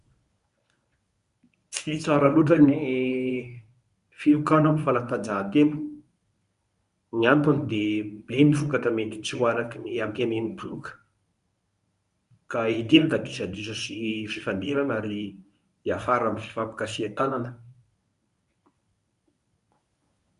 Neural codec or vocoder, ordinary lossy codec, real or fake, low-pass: codec, 24 kHz, 0.9 kbps, WavTokenizer, medium speech release version 1; none; fake; 10.8 kHz